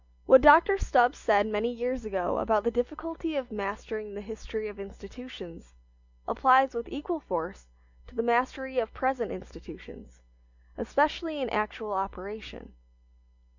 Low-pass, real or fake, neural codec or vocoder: 7.2 kHz; real; none